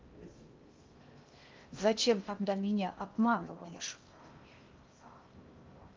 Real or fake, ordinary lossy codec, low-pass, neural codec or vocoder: fake; Opus, 32 kbps; 7.2 kHz; codec, 16 kHz in and 24 kHz out, 0.6 kbps, FocalCodec, streaming, 2048 codes